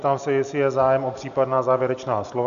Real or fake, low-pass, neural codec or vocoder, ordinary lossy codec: real; 7.2 kHz; none; MP3, 96 kbps